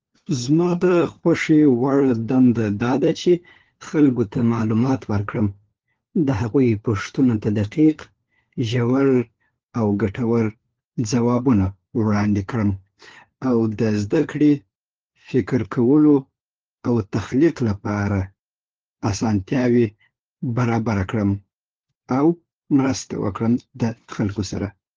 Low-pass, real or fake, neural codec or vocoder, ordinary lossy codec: 7.2 kHz; fake; codec, 16 kHz, 4 kbps, FunCodec, trained on LibriTTS, 50 frames a second; Opus, 16 kbps